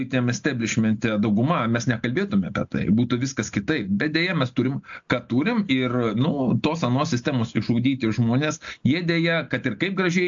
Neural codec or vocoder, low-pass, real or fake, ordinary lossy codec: none; 7.2 kHz; real; AAC, 64 kbps